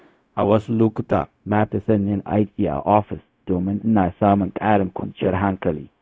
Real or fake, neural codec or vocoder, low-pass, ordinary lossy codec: fake; codec, 16 kHz, 0.4 kbps, LongCat-Audio-Codec; none; none